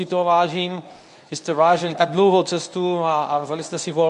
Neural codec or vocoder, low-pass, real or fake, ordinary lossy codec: codec, 24 kHz, 0.9 kbps, WavTokenizer, medium speech release version 1; 10.8 kHz; fake; MP3, 64 kbps